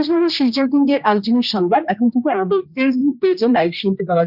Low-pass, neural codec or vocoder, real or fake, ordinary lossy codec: 5.4 kHz; codec, 16 kHz, 1 kbps, X-Codec, HuBERT features, trained on general audio; fake; none